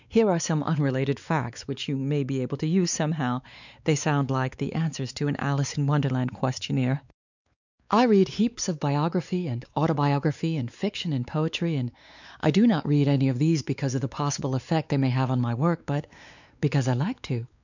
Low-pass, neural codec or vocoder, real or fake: 7.2 kHz; codec, 16 kHz, 4 kbps, X-Codec, WavLM features, trained on Multilingual LibriSpeech; fake